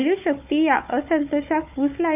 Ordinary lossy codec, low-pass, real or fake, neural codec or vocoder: none; 3.6 kHz; fake; codec, 16 kHz, 4 kbps, FunCodec, trained on Chinese and English, 50 frames a second